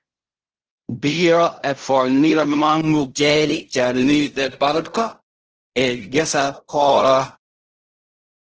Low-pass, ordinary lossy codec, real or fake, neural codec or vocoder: 7.2 kHz; Opus, 16 kbps; fake; codec, 16 kHz in and 24 kHz out, 0.4 kbps, LongCat-Audio-Codec, fine tuned four codebook decoder